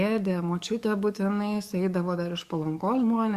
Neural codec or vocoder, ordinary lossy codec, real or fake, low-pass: codec, 44.1 kHz, 7.8 kbps, DAC; Opus, 64 kbps; fake; 14.4 kHz